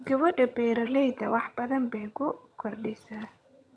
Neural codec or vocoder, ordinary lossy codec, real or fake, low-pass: vocoder, 22.05 kHz, 80 mel bands, HiFi-GAN; none; fake; none